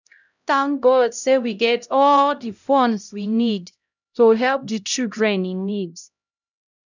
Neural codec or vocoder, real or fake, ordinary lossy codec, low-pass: codec, 16 kHz, 0.5 kbps, X-Codec, HuBERT features, trained on LibriSpeech; fake; none; 7.2 kHz